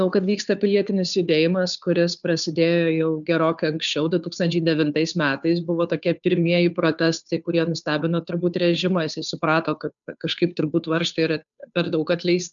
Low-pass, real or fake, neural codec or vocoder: 7.2 kHz; fake; codec, 16 kHz, 2 kbps, FunCodec, trained on Chinese and English, 25 frames a second